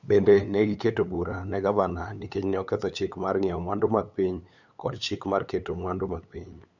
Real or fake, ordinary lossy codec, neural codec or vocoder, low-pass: fake; none; codec, 16 kHz, 8 kbps, FunCodec, trained on LibriTTS, 25 frames a second; 7.2 kHz